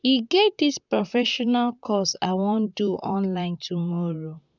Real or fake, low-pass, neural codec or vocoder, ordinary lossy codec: fake; 7.2 kHz; vocoder, 44.1 kHz, 128 mel bands, Pupu-Vocoder; none